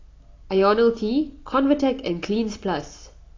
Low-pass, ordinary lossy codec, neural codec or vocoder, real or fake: 7.2 kHz; AAC, 32 kbps; none; real